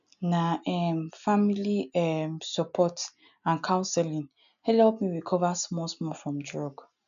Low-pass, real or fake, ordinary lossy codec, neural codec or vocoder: 7.2 kHz; real; none; none